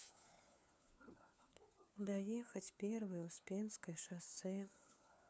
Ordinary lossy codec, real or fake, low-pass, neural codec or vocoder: none; fake; none; codec, 16 kHz, 4 kbps, FunCodec, trained on LibriTTS, 50 frames a second